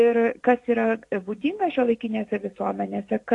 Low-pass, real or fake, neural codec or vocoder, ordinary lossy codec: 10.8 kHz; real; none; AAC, 48 kbps